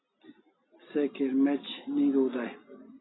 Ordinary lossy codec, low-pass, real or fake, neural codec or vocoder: AAC, 16 kbps; 7.2 kHz; real; none